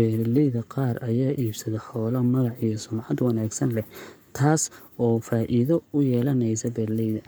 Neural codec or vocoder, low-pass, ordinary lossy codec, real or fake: codec, 44.1 kHz, 7.8 kbps, Pupu-Codec; none; none; fake